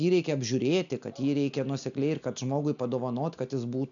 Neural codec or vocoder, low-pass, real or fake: none; 7.2 kHz; real